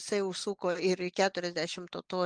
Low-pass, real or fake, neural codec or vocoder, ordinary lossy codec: 9.9 kHz; real; none; Opus, 32 kbps